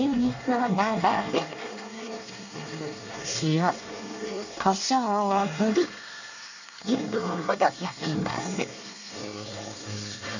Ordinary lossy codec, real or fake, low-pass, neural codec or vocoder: none; fake; 7.2 kHz; codec, 24 kHz, 1 kbps, SNAC